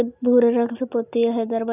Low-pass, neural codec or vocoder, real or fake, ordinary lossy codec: 3.6 kHz; none; real; none